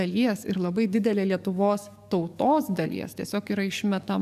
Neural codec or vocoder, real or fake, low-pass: codec, 44.1 kHz, 7.8 kbps, DAC; fake; 14.4 kHz